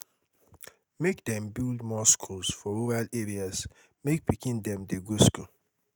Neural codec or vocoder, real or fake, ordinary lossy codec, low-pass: none; real; none; none